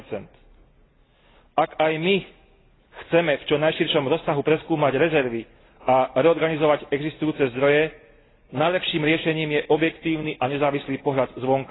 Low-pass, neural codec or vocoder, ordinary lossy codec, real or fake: 7.2 kHz; none; AAC, 16 kbps; real